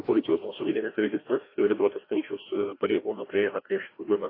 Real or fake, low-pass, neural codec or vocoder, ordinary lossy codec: fake; 5.4 kHz; codec, 16 kHz, 1 kbps, FreqCodec, larger model; AAC, 24 kbps